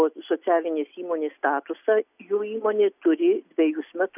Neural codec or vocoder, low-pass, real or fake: none; 3.6 kHz; real